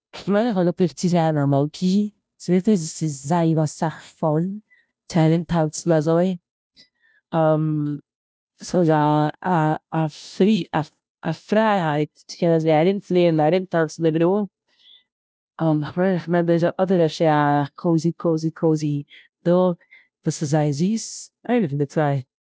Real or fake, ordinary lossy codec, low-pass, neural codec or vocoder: fake; none; none; codec, 16 kHz, 0.5 kbps, FunCodec, trained on Chinese and English, 25 frames a second